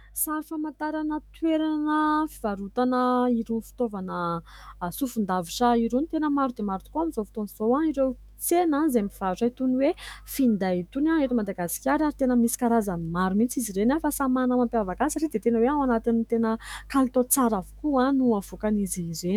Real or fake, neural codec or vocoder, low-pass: fake; autoencoder, 48 kHz, 128 numbers a frame, DAC-VAE, trained on Japanese speech; 19.8 kHz